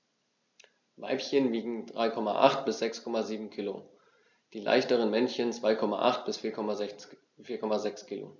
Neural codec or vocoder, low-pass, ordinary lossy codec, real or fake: none; 7.2 kHz; none; real